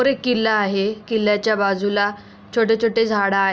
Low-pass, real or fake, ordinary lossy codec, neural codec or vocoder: none; real; none; none